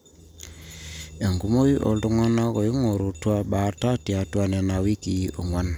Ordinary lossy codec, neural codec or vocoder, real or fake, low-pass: none; none; real; none